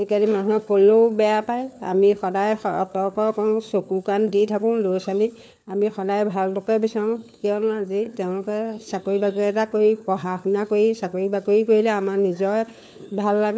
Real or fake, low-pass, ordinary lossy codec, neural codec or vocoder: fake; none; none; codec, 16 kHz, 4 kbps, FunCodec, trained on LibriTTS, 50 frames a second